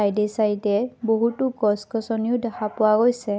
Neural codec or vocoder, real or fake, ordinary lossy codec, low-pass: none; real; none; none